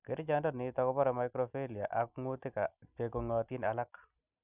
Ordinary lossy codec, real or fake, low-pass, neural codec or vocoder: none; real; 3.6 kHz; none